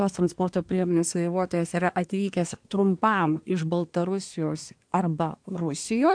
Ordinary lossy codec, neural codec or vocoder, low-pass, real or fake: AAC, 64 kbps; codec, 24 kHz, 1 kbps, SNAC; 9.9 kHz; fake